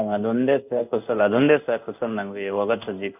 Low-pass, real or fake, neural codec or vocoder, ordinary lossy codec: 3.6 kHz; fake; codec, 16 kHz in and 24 kHz out, 1 kbps, XY-Tokenizer; none